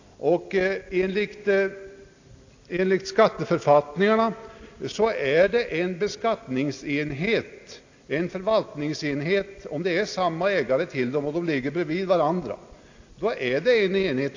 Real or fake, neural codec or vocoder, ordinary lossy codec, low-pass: real; none; AAC, 48 kbps; 7.2 kHz